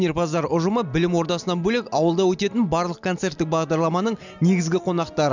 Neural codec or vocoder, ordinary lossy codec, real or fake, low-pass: none; none; real; 7.2 kHz